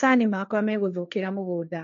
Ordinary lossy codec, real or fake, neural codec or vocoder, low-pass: MP3, 96 kbps; fake; codec, 16 kHz, 1.1 kbps, Voila-Tokenizer; 7.2 kHz